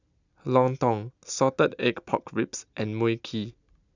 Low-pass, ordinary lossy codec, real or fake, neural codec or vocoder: 7.2 kHz; none; real; none